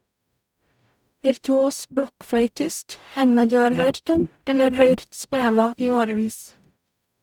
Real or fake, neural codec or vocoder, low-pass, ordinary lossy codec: fake; codec, 44.1 kHz, 0.9 kbps, DAC; 19.8 kHz; none